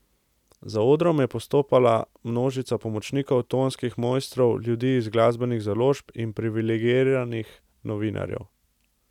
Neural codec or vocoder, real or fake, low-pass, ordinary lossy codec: none; real; 19.8 kHz; none